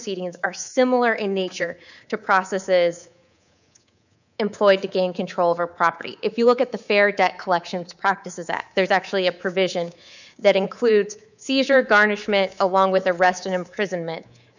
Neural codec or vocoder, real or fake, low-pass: codec, 24 kHz, 3.1 kbps, DualCodec; fake; 7.2 kHz